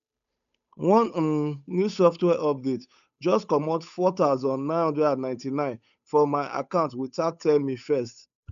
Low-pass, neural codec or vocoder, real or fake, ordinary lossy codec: 7.2 kHz; codec, 16 kHz, 8 kbps, FunCodec, trained on Chinese and English, 25 frames a second; fake; none